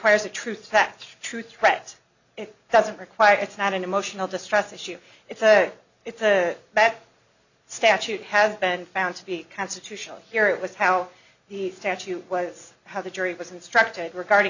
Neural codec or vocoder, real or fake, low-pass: none; real; 7.2 kHz